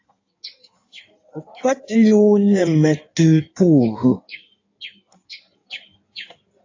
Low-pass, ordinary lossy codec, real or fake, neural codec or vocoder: 7.2 kHz; AAC, 48 kbps; fake; codec, 16 kHz in and 24 kHz out, 1.1 kbps, FireRedTTS-2 codec